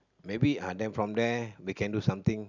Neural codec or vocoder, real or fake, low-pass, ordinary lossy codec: none; real; 7.2 kHz; none